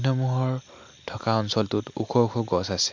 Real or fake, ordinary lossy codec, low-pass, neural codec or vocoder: real; MP3, 64 kbps; 7.2 kHz; none